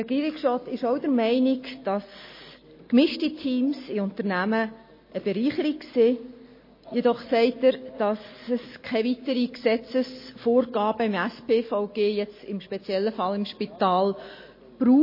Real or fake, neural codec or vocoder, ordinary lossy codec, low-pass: real; none; MP3, 24 kbps; 5.4 kHz